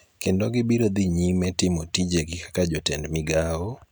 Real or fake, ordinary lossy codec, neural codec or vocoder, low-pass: real; none; none; none